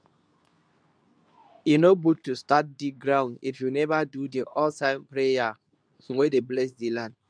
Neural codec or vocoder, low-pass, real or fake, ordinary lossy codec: codec, 24 kHz, 0.9 kbps, WavTokenizer, medium speech release version 2; 9.9 kHz; fake; none